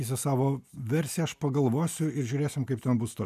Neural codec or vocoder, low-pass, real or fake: none; 14.4 kHz; real